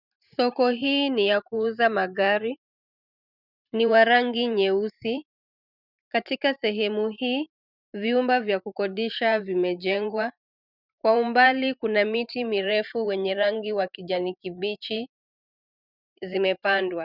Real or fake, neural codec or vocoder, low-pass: fake; vocoder, 44.1 kHz, 128 mel bands every 512 samples, BigVGAN v2; 5.4 kHz